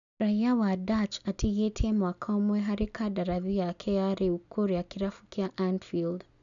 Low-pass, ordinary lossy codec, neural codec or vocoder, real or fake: 7.2 kHz; none; none; real